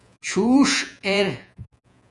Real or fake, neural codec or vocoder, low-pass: fake; vocoder, 48 kHz, 128 mel bands, Vocos; 10.8 kHz